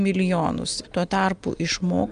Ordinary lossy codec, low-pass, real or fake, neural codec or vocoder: Opus, 32 kbps; 9.9 kHz; real; none